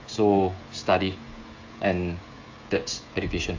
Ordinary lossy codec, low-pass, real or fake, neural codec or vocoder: AAC, 48 kbps; 7.2 kHz; fake; codec, 16 kHz, 16 kbps, FreqCodec, smaller model